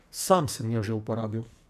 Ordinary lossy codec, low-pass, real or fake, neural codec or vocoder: none; 14.4 kHz; fake; codec, 44.1 kHz, 2.6 kbps, SNAC